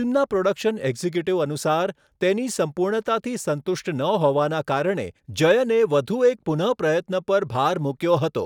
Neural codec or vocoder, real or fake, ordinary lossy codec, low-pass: none; real; none; 19.8 kHz